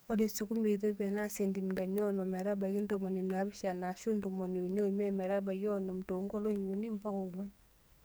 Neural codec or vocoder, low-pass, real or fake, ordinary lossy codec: codec, 44.1 kHz, 2.6 kbps, SNAC; none; fake; none